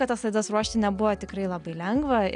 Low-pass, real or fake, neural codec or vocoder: 9.9 kHz; real; none